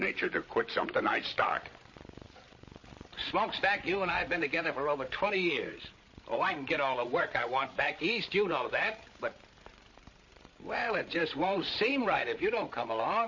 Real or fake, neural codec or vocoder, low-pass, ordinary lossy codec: fake; codec, 16 kHz, 16 kbps, FreqCodec, larger model; 7.2 kHz; MP3, 32 kbps